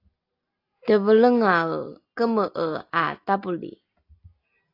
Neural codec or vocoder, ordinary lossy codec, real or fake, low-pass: none; AAC, 32 kbps; real; 5.4 kHz